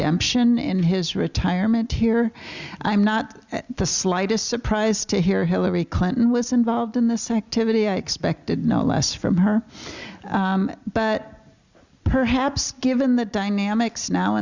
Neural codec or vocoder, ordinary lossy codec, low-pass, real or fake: none; Opus, 64 kbps; 7.2 kHz; real